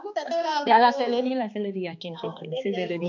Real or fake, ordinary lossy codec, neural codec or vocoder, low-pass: fake; none; codec, 16 kHz, 2 kbps, X-Codec, HuBERT features, trained on balanced general audio; 7.2 kHz